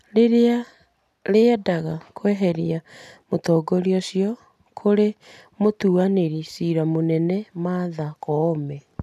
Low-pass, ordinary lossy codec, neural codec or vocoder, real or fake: 14.4 kHz; none; none; real